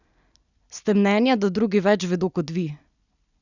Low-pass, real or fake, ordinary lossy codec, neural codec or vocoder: 7.2 kHz; real; none; none